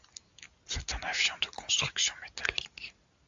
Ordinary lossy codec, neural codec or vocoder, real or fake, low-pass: AAC, 64 kbps; none; real; 7.2 kHz